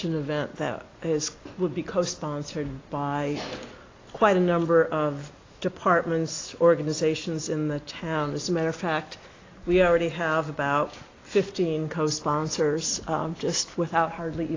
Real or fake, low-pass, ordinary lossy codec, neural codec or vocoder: real; 7.2 kHz; AAC, 32 kbps; none